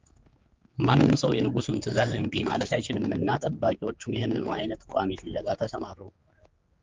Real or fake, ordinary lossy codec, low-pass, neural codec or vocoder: fake; Opus, 32 kbps; 7.2 kHz; codec, 16 kHz, 4 kbps, X-Codec, HuBERT features, trained on general audio